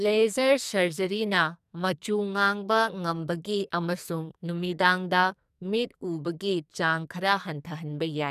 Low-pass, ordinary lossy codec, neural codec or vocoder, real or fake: 14.4 kHz; none; codec, 44.1 kHz, 2.6 kbps, SNAC; fake